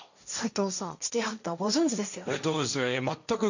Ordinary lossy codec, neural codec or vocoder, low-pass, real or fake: none; codec, 16 kHz, 1.1 kbps, Voila-Tokenizer; 7.2 kHz; fake